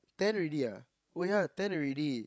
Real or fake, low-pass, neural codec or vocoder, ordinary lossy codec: fake; none; codec, 16 kHz, 16 kbps, FreqCodec, larger model; none